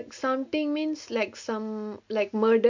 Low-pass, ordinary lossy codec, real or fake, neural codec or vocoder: 7.2 kHz; MP3, 48 kbps; real; none